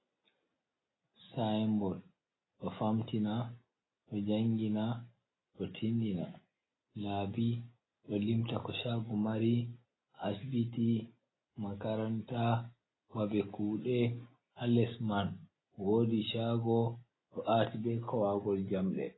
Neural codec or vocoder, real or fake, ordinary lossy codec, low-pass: none; real; AAC, 16 kbps; 7.2 kHz